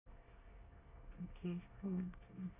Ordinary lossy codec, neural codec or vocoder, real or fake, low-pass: none; codec, 24 kHz, 1 kbps, SNAC; fake; 3.6 kHz